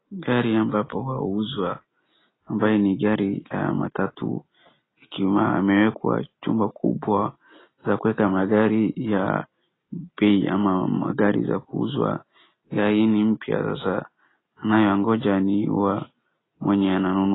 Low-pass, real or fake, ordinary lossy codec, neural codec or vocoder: 7.2 kHz; real; AAC, 16 kbps; none